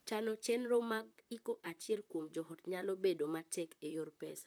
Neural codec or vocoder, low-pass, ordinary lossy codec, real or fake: vocoder, 44.1 kHz, 128 mel bands, Pupu-Vocoder; none; none; fake